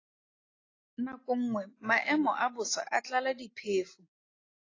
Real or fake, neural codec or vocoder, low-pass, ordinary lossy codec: real; none; 7.2 kHz; AAC, 32 kbps